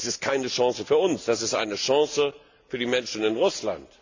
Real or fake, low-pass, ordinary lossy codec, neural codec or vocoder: real; 7.2 kHz; AAC, 48 kbps; none